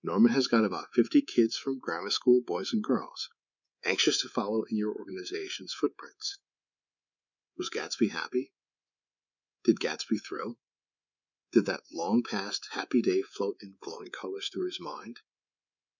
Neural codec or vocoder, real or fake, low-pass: codec, 24 kHz, 3.1 kbps, DualCodec; fake; 7.2 kHz